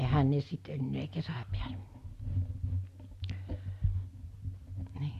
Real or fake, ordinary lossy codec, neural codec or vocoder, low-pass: real; Opus, 64 kbps; none; 14.4 kHz